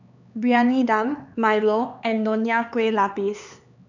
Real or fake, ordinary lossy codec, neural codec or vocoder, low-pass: fake; none; codec, 16 kHz, 4 kbps, X-Codec, HuBERT features, trained on LibriSpeech; 7.2 kHz